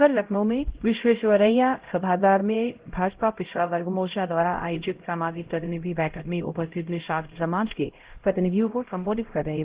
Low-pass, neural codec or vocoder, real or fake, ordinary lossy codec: 3.6 kHz; codec, 16 kHz, 0.5 kbps, X-Codec, HuBERT features, trained on LibriSpeech; fake; Opus, 16 kbps